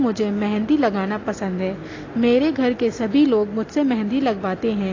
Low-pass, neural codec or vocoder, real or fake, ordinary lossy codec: 7.2 kHz; none; real; AAC, 32 kbps